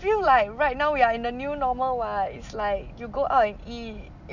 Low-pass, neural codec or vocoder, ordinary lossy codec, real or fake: 7.2 kHz; none; none; real